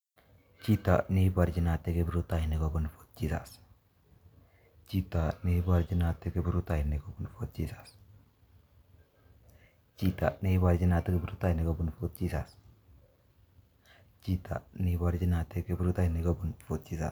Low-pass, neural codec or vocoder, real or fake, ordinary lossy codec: none; none; real; none